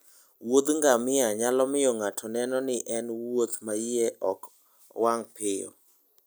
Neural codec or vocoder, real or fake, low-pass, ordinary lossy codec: none; real; none; none